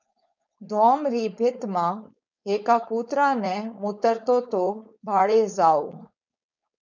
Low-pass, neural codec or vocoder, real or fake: 7.2 kHz; codec, 16 kHz, 4.8 kbps, FACodec; fake